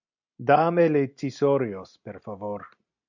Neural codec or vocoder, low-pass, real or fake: none; 7.2 kHz; real